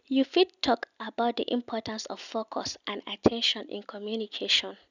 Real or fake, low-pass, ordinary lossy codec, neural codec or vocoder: real; 7.2 kHz; none; none